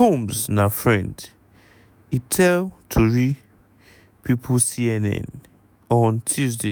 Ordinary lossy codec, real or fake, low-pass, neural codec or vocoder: none; fake; none; autoencoder, 48 kHz, 128 numbers a frame, DAC-VAE, trained on Japanese speech